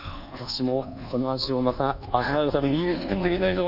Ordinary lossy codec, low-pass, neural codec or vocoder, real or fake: none; 5.4 kHz; codec, 24 kHz, 1.2 kbps, DualCodec; fake